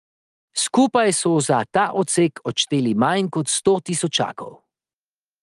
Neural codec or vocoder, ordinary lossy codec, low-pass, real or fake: none; Opus, 32 kbps; 10.8 kHz; real